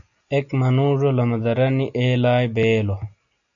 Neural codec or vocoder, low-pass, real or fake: none; 7.2 kHz; real